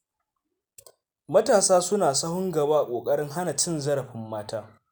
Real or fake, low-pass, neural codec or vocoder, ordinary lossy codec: real; none; none; none